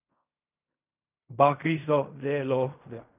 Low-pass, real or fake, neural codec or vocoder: 3.6 kHz; fake; codec, 16 kHz in and 24 kHz out, 0.4 kbps, LongCat-Audio-Codec, fine tuned four codebook decoder